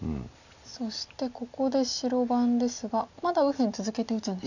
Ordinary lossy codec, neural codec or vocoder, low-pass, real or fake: none; none; 7.2 kHz; real